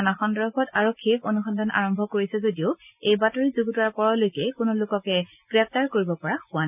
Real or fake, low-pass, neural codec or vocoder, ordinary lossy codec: real; 3.6 kHz; none; none